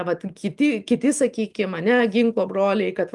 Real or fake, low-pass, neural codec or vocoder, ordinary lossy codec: real; 10.8 kHz; none; Opus, 32 kbps